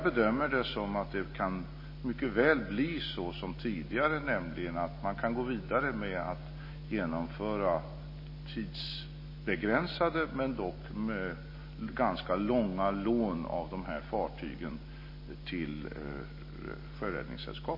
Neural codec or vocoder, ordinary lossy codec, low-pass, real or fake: none; MP3, 24 kbps; 5.4 kHz; real